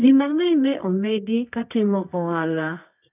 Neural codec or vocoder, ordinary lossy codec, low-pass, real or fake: codec, 24 kHz, 0.9 kbps, WavTokenizer, medium music audio release; none; 3.6 kHz; fake